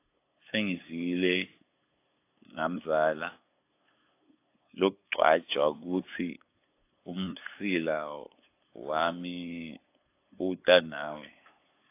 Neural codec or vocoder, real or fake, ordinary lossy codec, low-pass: codec, 16 kHz, 8 kbps, FunCodec, trained on LibriTTS, 25 frames a second; fake; AAC, 24 kbps; 3.6 kHz